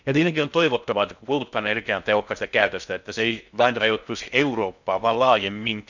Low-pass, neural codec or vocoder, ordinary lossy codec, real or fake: 7.2 kHz; codec, 16 kHz in and 24 kHz out, 0.6 kbps, FocalCodec, streaming, 2048 codes; none; fake